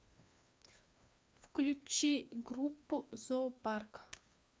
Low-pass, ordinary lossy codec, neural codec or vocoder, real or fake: none; none; codec, 16 kHz, 2 kbps, FreqCodec, larger model; fake